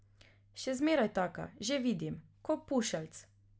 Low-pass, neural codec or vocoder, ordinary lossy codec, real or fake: none; none; none; real